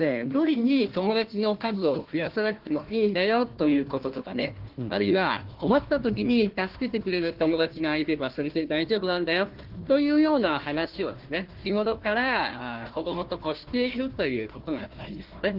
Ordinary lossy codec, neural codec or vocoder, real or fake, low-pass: Opus, 16 kbps; codec, 16 kHz, 1 kbps, FunCodec, trained on Chinese and English, 50 frames a second; fake; 5.4 kHz